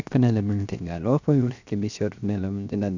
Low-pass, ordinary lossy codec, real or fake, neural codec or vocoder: 7.2 kHz; none; fake; codec, 16 kHz, 0.7 kbps, FocalCodec